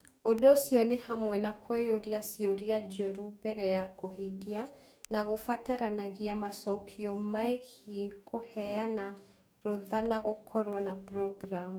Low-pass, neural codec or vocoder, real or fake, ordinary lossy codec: none; codec, 44.1 kHz, 2.6 kbps, DAC; fake; none